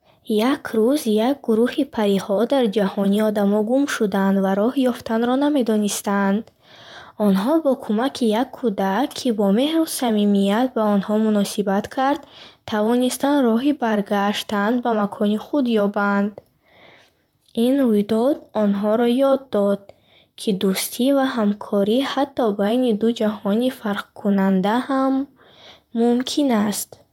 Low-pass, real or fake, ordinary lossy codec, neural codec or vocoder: 19.8 kHz; fake; none; vocoder, 44.1 kHz, 128 mel bands, Pupu-Vocoder